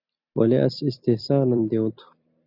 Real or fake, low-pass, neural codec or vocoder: fake; 5.4 kHz; vocoder, 44.1 kHz, 128 mel bands every 256 samples, BigVGAN v2